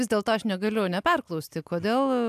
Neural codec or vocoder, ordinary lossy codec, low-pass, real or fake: none; AAC, 96 kbps; 14.4 kHz; real